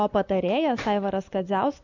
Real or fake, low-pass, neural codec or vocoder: real; 7.2 kHz; none